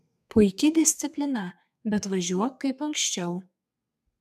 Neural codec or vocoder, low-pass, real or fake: codec, 32 kHz, 1.9 kbps, SNAC; 14.4 kHz; fake